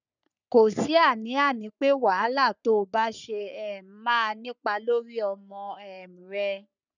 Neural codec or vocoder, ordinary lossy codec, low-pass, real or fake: codec, 44.1 kHz, 3.4 kbps, Pupu-Codec; none; 7.2 kHz; fake